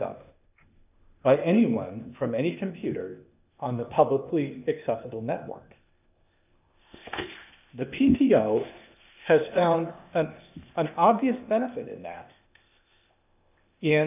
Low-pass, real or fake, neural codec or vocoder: 3.6 kHz; fake; codec, 16 kHz, 0.8 kbps, ZipCodec